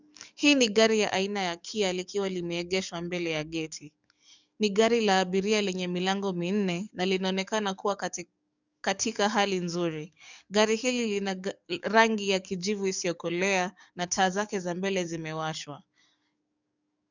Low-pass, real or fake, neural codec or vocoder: 7.2 kHz; fake; codec, 44.1 kHz, 7.8 kbps, DAC